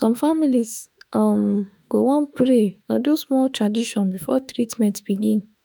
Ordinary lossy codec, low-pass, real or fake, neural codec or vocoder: none; none; fake; autoencoder, 48 kHz, 32 numbers a frame, DAC-VAE, trained on Japanese speech